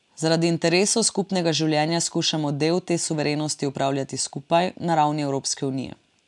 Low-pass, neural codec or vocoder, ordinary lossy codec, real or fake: 10.8 kHz; none; none; real